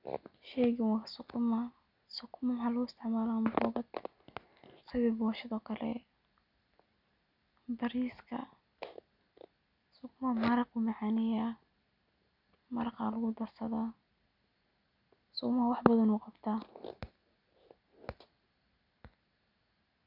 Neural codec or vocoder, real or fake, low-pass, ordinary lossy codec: none; real; 5.4 kHz; none